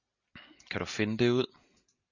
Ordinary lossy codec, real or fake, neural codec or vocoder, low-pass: Opus, 64 kbps; real; none; 7.2 kHz